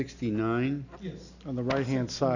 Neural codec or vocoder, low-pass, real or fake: none; 7.2 kHz; real